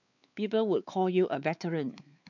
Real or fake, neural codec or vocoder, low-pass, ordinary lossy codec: fake; codec, 16 kHz, 4 kbps, X-Codec, WavLM features, trained on Multilingual LibriSpeech; 7.2 kHz; none